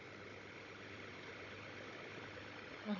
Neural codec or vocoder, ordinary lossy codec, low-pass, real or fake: codec, 16 kHz, 16 kbps, FunCodec, trained on Chinese and English, 50 frames a second; none; 7.2 kHz; fake